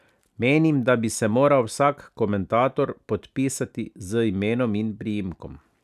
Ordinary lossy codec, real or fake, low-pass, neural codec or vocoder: none; real; 14.4 kHz; none